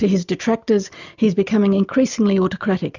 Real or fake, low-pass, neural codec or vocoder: real; 7.2 kHz; none